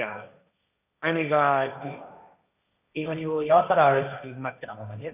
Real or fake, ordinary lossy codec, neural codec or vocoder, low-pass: fake; none; codec, 16 kHz, 1.1 kbps, Voila-Tokenizer; 3.6 kHz